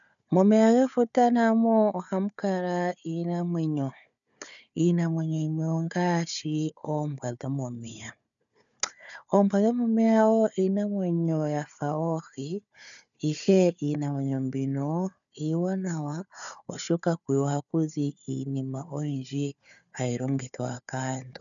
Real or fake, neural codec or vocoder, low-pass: fake; codec, 16 kHz, 4 kbps, FunCodec, trained on Chinese and English, 50 frames a second; 7.2 kHz